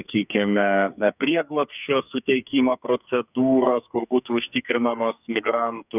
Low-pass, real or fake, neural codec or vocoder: 3.6 kHz; fake; codec, 44.1 kHz, 2.6 kbps, SNAC